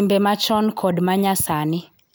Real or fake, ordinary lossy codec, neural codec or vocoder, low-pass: real; none; none; none